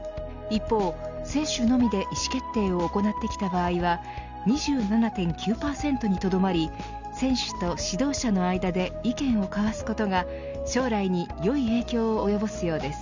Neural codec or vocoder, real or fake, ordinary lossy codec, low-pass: none; real; Opus, 64 kbps; 7.2 kHz